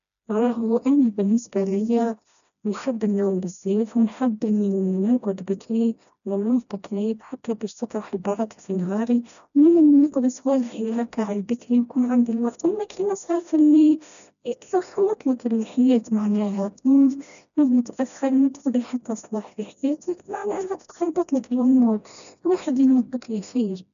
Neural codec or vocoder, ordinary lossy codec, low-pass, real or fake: codec, 16 kHz, 1 kbps, FreqCodec, smaller model; none; 7.2 kHz; fake